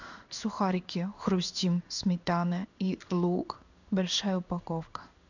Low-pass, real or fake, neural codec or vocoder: 7.2 kHz; fake; codec, 16 kHz in and 24 kHz out, 1 kbps, XY-Tokenizer